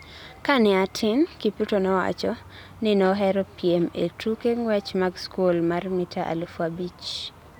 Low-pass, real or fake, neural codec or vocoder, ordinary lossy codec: 19.8 kHz; real; none; none